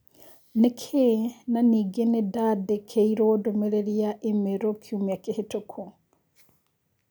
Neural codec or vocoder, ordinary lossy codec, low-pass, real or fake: none; none; none; real